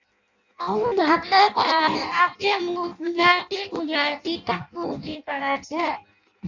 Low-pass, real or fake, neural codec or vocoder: 7.2 kHz; fake; codec, 16 kHz in and 24 kHz out, 0.6 kbps, FireRedTTS-2 codec